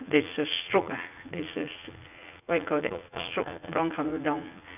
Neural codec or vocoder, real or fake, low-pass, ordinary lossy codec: vocoder, 44.1 kHz, 80 mel bands, Vocos; fake; 3.6 kHz; none